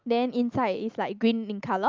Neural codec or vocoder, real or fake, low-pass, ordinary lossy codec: none; real; 7.2 kHz; Opus, 32 kbps